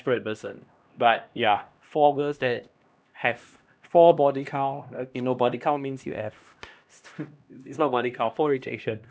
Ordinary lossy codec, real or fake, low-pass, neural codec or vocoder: none; fake; none; codec, 16 kHz, 1 kbps, X-Codec, HuBERT features, trained on LibriSpeech